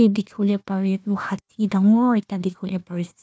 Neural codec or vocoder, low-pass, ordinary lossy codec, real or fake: codec, 16 kHz, 1 kbps, FunCodec, trained on Chinese and English, 50 frames a second; none; none; fake